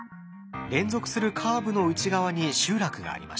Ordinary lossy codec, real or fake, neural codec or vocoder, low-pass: none; real; none; none